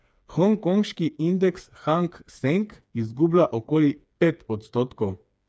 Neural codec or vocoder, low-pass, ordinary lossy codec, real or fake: codec, 16 kHz, 4 kbps, FreqCodec, smaller model; none; none; fake